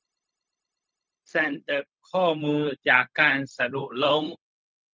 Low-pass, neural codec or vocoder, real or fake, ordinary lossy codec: none; codec, 16 kHz, 0.4 kbps, LongCat-Audio-Codec; fake; none